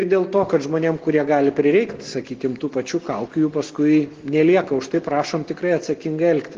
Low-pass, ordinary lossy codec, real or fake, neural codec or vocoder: 7.2 kHz; Opus, 16 kbps; real; none